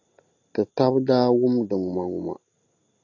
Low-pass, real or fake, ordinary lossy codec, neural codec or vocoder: 7.2 kHz; real; AAC, 48 kbps; none